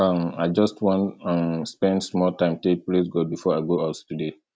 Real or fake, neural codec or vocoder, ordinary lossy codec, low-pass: real; none; none; none